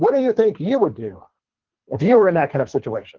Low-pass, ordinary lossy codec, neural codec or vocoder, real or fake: 7.2 kHz; Opus, 16 kbps; codec, 24 kHz, 3 kbps, HILCodec; fake